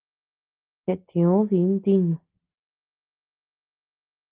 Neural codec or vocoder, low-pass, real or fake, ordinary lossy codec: none; 3.6 kHz; real; Opus, 16 kbps